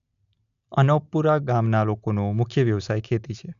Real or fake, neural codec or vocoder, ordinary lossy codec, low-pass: real; none; none; 7.2 kHz